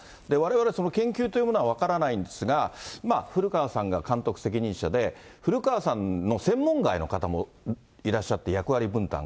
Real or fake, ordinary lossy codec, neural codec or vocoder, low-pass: real; none; none; none